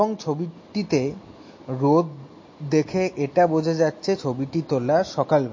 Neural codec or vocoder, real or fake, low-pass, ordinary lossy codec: none; real; 7.2 kHz; MP3, 32 kbps